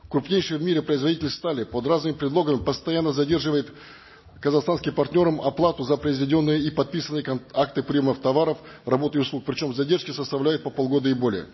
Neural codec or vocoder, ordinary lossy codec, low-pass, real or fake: none; MP3, 24 kbps; 7.2 kHz; real